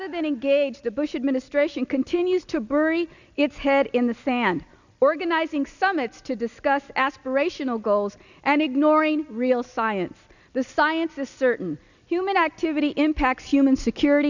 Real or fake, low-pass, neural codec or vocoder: real; 7.2 kHz; none